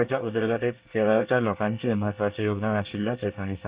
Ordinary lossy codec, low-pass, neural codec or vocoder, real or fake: Opus, 64 kbps; 3.6 kHz; codec, 24 kHz, 1 kbps, SNAC; fake